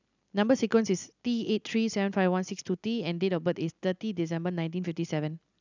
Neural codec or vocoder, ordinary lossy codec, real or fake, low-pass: none; none; real; 7.2 kHz